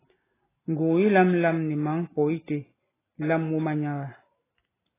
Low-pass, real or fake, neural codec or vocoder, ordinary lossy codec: 3.6 kHz; real; none; AAC, 16 kbps